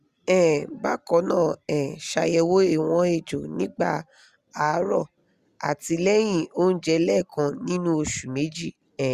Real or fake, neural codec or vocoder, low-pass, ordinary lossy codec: real; none; 14.4 kHz; Opus, 64 kbps